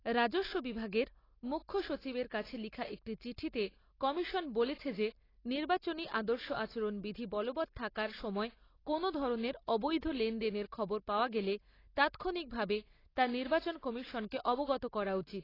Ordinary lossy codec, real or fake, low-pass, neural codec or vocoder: AAC, 24 kbps; real; 5.4 kHz; none